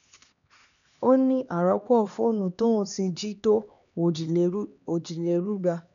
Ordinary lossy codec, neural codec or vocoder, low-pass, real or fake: none; codec, 16 kHz, 2 kbps, X-Codec, HuBERT features, trained on LibriSpeech; 7.2 kHz; fake